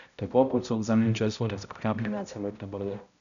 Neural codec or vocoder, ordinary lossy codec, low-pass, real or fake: codec, 16 kHz, 0.5 kbps, X-Codec, HuBERT features, trained on balanced general audio; none; 7.2 kHz; fake